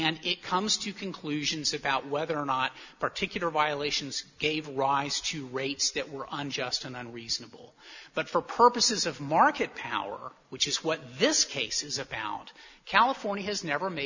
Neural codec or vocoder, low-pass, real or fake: none; 7.2 kHz; real